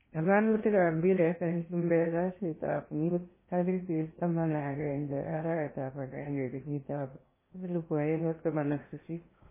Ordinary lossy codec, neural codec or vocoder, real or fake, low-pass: MP3, 16 kbps; codec, 16 kHz in and 24 kHz out, 0.6 kbps, FocalCodec, streaming, 2048 codes; fake; 3.6 kHz